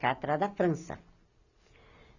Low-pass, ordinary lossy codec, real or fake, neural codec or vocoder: 7.2 kHz; none; real; none